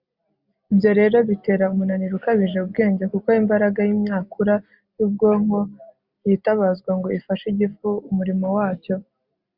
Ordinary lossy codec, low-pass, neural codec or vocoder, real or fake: Opus, 64 kbps; 5.4 kHz; none; real